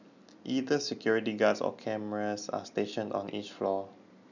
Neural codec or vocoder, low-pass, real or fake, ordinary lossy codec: none; 7.2 kHz; real; none